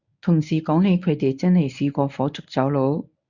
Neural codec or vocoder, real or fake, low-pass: codec, 24 kHz, 0.9 kbps, WavTokenizer, medium speech release version 1; fake; 7.2 kHz